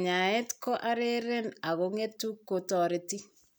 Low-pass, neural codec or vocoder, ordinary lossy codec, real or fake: none; none; none; real